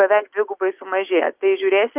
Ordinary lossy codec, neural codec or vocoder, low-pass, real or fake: Opus, 24 kbps; none; 3.6 kHz; real